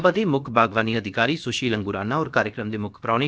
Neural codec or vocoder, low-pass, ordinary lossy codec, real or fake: codec, 16 kHz, about 1 kbps, DyCAST, with the encoder's durations; none; none; fake